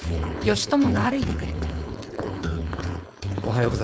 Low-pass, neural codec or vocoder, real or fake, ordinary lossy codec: none; codec, 16 kHz, 4.8 kbps, FACodec; fake; none